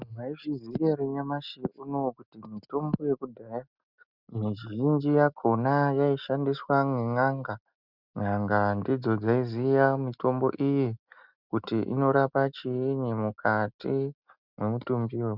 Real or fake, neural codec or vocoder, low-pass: real; none; 5.4 kHz